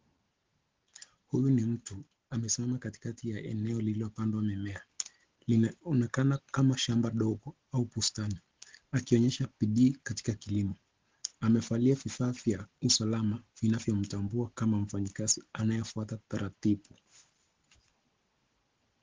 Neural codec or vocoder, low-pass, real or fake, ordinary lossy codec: none; 7.2 kHz; real; Opus, 16 kbps